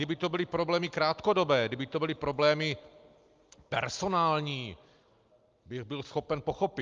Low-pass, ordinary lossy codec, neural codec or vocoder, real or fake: 7.2 kHz; Opus, 32 kbps; none; real